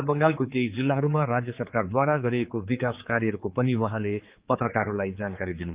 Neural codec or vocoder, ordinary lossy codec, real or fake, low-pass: codec, 16 kHz, 4 kbps, X-Codec, HuBERT features, trained on general audio; Opus, 32 kbps; fake; 3.6 kHz